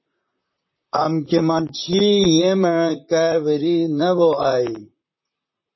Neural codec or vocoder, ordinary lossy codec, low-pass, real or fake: vocoder, 44.1 kHz, 128 mel bands, Pupu-Vocoder; MP3, 24 kbps; 7.2 kHz; fake